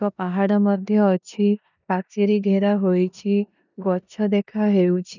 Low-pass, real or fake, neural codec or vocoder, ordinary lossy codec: 7.2 kHz; fake; codec, 16 kHz in and 24 kHz out, 0.9 kbps, LongCat-Audio-Codec, four codebook decoder; none